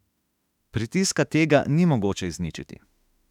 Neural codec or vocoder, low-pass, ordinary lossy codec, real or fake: autoencoder, 48 kHz, 32 numbers a frame, DAC-VAE, trained on Japanese speech; 19.8 kHz; none; fake